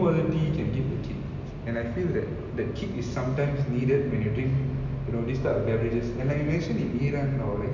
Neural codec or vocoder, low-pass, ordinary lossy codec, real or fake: none; 7.2 kHz; none; real